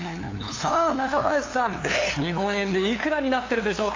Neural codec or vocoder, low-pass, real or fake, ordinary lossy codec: codec, 16 kHz, 2 kbps, FunCodec, trained on LibriTTS, 25 frames a second; 7.2 kHz; fake; AAC, 32 kbps